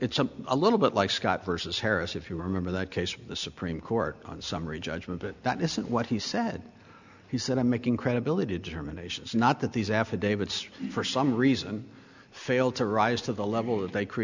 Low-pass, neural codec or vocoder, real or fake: 7.2 kHz; none; real